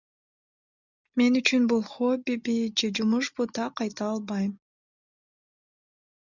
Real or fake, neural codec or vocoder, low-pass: real; none; 7.2 kHz